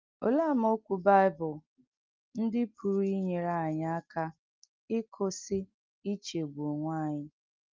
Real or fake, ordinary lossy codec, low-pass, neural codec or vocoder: real; Opus, 24 kbps; 7.2 kHz; none